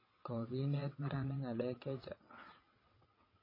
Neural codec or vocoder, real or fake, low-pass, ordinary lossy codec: vocoder, 22.05 kHz, 80 mel bands, WaveNeXt; fake; 5.4 kHz; MP3, 24 kbps